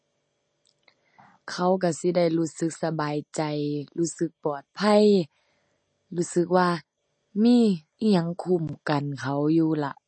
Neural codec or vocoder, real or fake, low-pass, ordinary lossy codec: none; real; 9.9 kHz; MP3, 32 kbps